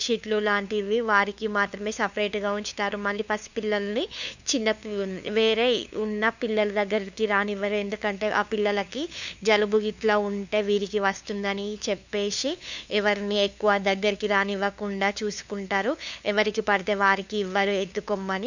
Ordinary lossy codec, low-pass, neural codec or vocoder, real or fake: none; 7.2 kHz; codec, 16 kHz, 2 kbps, FunCodec, trained on LibriTTS, 25 frames a second; fake